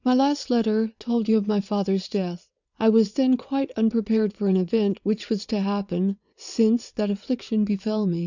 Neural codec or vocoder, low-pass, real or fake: vocoder, 22.05 kHz, 80 mel bands, WaveNeXt; 7.2 kHz; fake